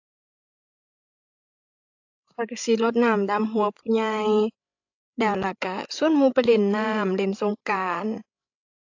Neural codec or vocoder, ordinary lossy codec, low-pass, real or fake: codec, 16 kHz, 16 kbps, FreqCodec, larger model; AAC, 48 kbps; 7.2 kHz; fake